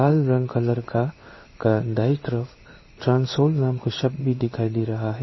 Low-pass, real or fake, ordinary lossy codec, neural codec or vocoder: 7.2 kHz; fake; MP3, 24 kbps; codec, 16 kHz in and 24 kHz out, 1 kbps, XY-Tokenizer